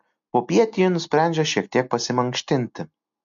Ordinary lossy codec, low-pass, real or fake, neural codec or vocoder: MP3, 48 kbps; 7.2 kHz; real; none